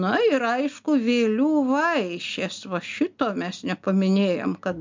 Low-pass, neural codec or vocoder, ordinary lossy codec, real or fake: 7.2 kHz; none; MP3, 64 kbps; real